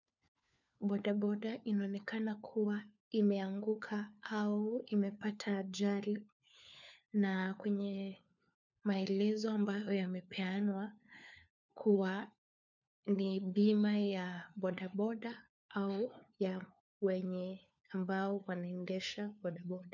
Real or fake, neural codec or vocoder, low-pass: fake; codec, 16 kHz, 4 kbps, FunCodec, trained on LibriTTS, 50 frames a second; 7.2 kHz